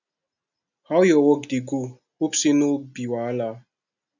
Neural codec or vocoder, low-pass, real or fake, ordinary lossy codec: none; 7.2 kHz; real; none